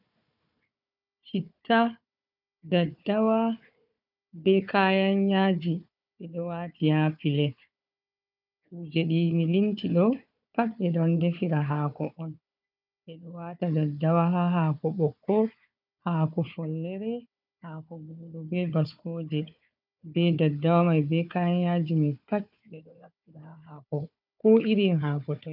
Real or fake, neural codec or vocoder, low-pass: fake; codec, 16 kHz, 4 kbps, FunCodec, trained on Chinese and English, 50 frames a second; 5.4 kHz